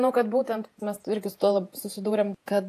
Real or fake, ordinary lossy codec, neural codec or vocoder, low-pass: fake; AAC, 64 kbps; vocoder, 48 kHz, 128 mel bands, Vocos; 14.4 kHz